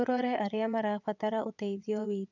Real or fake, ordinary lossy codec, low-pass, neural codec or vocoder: fake; none; 7.2 kHz; vocoder, 22.05 kHz, 80 mel bands, WaveNeXt